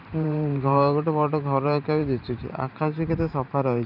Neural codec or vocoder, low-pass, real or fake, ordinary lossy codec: vocoder, 44.1 kHz, 128 mel bands every 512 samples, BigVGAN v2; 5.4 kHz; fake; none